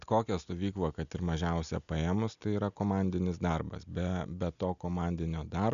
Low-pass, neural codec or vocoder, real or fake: 7.2 kHz; none; real